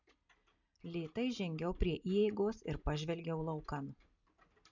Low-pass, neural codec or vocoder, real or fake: 7.2 kHz; none; real